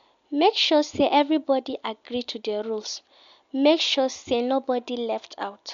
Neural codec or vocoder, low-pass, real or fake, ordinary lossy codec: none; 7.2 kHz; real; MP3, 64 kbps